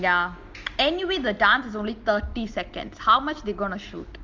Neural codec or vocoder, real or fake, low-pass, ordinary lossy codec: none; real; 7.2 kHz; Opus, 32 kbps